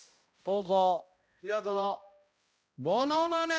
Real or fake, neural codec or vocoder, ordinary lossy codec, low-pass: fake; codec, 16 kHz, 0.5 kbps, X-Codec, HuBERT features, trained on balanced general audio; none; none